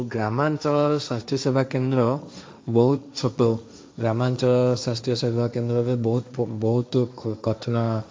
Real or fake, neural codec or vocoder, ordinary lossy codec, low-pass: fake; codec, 16 kHz, 1.1 kbps, Voila-Tokenizer; none; none